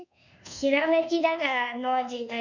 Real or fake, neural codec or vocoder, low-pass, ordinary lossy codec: fake; codec, 24 kHz, 1.2 kbps, DualCodec; 7.2 kHz; none